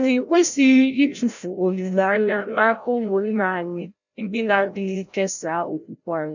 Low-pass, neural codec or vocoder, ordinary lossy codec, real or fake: 7.2 kHz; codec, 16 kHz, 0.5 kbps, FreqCodec, larger model; none; fake